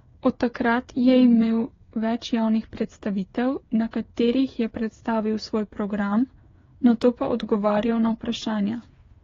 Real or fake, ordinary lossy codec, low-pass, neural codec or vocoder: fake; AAC, 32 kbps; 7.2 kHz; codec, 16 kHz, 8 kbps, FreqCodec, smaller model